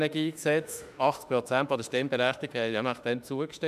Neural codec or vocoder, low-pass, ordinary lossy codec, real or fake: autoencoder, 48 kHz, 32 numbers a frame, DAC-VAE, trained on Japanese speech; 14.4 kHz; none; fake